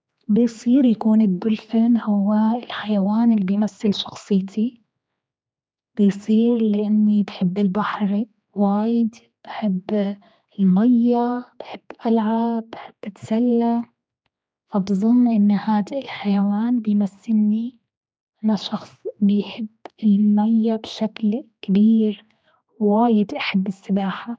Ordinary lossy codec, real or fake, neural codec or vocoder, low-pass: none; fake; codec, 16 kHz, 2 kbps, X-Codec, HuBERT features, trained on general audio; none